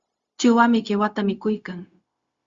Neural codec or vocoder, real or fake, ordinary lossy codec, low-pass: codec, 16 kHz, 0.4 kbps, LongCat-Audio-Codec; fake; Opus, 64 kbps; 7.2 kHz